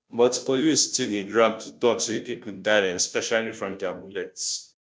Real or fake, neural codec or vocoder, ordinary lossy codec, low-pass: fake; codec, 16 kHz, 0.5 kbps, FunCodec, trained on Chinese and English, 25 frames a second; none; none